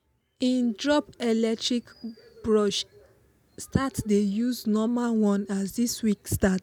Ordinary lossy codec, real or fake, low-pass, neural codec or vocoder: none; real; none; none